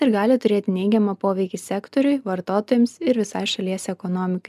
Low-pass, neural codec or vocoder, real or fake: 14.4 kHz; none; real